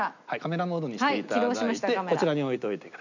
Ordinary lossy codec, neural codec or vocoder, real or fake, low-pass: none; none; real; 7.2 kHz